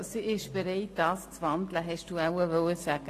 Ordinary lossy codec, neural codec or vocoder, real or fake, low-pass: AAC, 48 kbps; none; real; 14.4 kHz